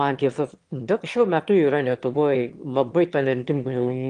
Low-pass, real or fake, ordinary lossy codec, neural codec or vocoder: 9.9 kHz; fake; Opus, 24 kbps; autoencoder, 22.05 kHz, a latent of 192 numbers a frame, VITS, trained on one speaker